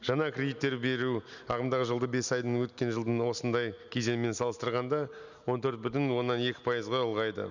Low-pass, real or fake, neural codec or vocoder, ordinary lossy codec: 7.2 kHz; real; none; none